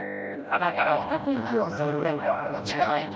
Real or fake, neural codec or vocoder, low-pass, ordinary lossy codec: fake; codec, 16 kHz, 0.5 kbps, FreqCodec, smaller model; none; none